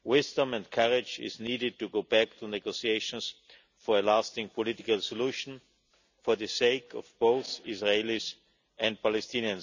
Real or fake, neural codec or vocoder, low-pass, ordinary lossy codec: real; none; 7.2 kHz; none